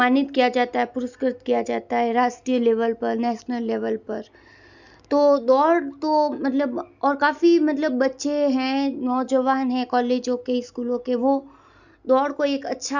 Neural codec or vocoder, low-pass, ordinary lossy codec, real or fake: none; 7.2 kHz; none; real